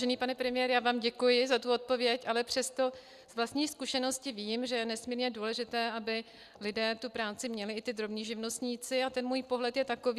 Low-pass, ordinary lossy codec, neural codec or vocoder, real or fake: 14.4 kHz; Opus, 64 kbps; none; real